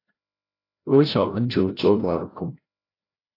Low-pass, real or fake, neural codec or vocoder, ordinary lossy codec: 5.4 kHz; fake; codec, 16 kHz, 0.5 kbps, FreqCodec, larger model; AAC, 24 kbps